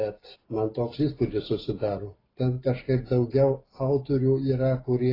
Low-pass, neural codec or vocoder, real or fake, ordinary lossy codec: 5.4 kHz; none; real; AAC, 24 kbps